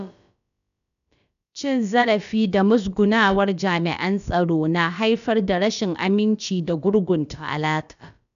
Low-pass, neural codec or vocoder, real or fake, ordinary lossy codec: 7.2 kHz; codec, 16 kHz, about 1 kbps, DyCAST, with the encoder's durations; fake; none